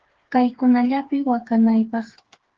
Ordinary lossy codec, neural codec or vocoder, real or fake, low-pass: Opus, 16 kbps; codec, 16 kHz, 4 kbps, FreqCodec, smaller model; fake; 7.2 kHz